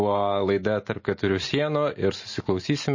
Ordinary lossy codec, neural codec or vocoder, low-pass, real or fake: MP3, 32 kbps; none; 7.2 kHz; real